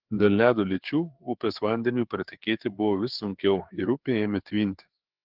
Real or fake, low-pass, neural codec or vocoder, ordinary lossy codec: fake; 5.4 kHz; codec, 16 kHz, 4 kbps, FreqCodec, larger model; Opus, 16 kbps